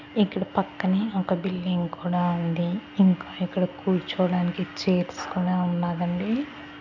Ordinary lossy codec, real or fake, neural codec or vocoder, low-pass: none; real; none; 7.2 kHz